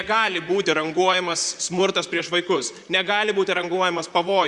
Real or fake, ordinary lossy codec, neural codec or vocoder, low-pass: fake; Opus, 64 kbps; vocoder, 44.1 kHz, 128 mel bands, Pupu-Vocoder; 10.8 kHz